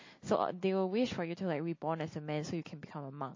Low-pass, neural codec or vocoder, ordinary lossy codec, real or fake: 7.2 kHz; none; MP3, 32 kbps; real